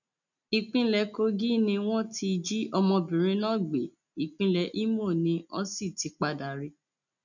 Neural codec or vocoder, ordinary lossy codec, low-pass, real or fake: none; none; 7.2 kHz; real